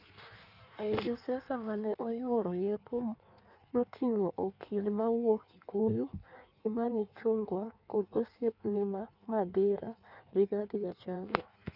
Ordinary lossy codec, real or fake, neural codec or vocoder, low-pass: none; fake; codec, 16 kHz in and 24 kHz out, 1.1 kbps, FireRedTTS-2 codec; 5.4 kHz